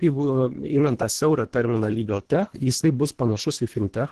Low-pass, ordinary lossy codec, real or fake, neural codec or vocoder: 10.8 kHz; Opus, 16 kbps; fake; codec, 24 kHz, 1.5 kbps, HILCodec